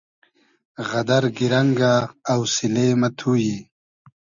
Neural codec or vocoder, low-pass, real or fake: none; 7.2 kHz; real